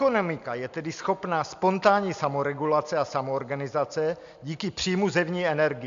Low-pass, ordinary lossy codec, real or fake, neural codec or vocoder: 7.2 kHz; MP3, 64 kbps; real; none